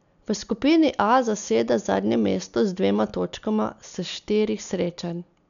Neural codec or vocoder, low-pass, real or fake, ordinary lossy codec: none; 7.2 kHz; real; none